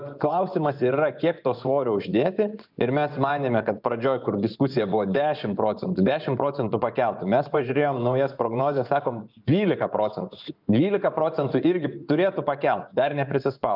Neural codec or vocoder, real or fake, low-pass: vocoder, 24 kHz, 100 mel bands, Vocos; fake; 5.4 kHz